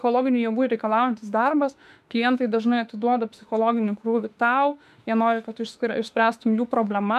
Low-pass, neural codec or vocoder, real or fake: 14.4 kHz; autoencoder, 48 kHz, 32 numbers a frame, DAC-VAE, trained on Japanese speech; fake